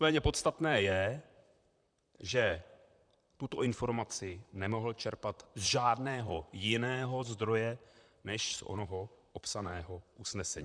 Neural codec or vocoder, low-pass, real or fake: vocoder, 44.1 kHz, 128 mel bands, Pupu-Vocoder; 9.9 kHz; fake